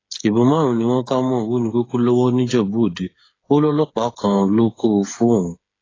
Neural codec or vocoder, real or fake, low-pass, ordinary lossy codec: codec, 16 kHz, 8 kbps, FreqCodec, smaller model; fake; 7.2 kHz; AAC, 32 kbps